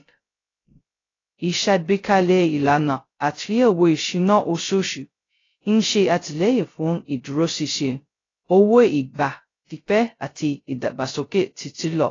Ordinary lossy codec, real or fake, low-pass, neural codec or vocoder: AAC, 32 kbps; fake; 7.2 kHz; codec, 16 kHz, 0.2 kbps, FocalCodec